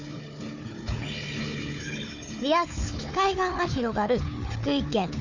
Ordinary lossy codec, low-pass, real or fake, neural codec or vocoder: none; 7.2 kHz; fake; codec, 16 kHz, 4 kbps, FunCodec, trained on Chinese and English, 50 frames a second